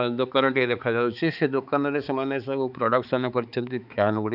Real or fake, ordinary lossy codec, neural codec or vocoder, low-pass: fake; none; codec, 16 kHz, 4 kbps, X-Codec, HuBERT features, trained on balanced general audio; 5.4 kHz